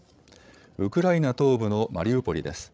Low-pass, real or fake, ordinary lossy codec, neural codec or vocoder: none; fake; none; codec, 16 kHz, 16 kbps, FreqCodec, larger model